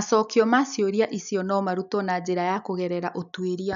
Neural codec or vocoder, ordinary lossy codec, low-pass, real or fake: none; none; 7.2 kHz; real